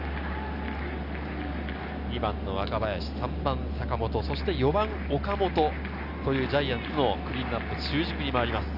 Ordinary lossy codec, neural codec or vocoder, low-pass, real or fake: none; none; 5.4 kHz; real